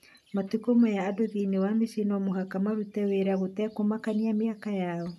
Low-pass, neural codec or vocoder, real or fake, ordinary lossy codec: 14.4 kHz; none; real; none